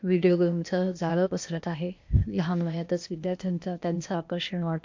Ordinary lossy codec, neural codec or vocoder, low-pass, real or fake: MP3, 48 kbps; codec, 16 kHz, 0.8 kbps, ZipCodec; 7.2 kHz; fake